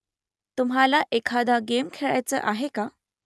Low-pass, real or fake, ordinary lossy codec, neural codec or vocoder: none; real; none; none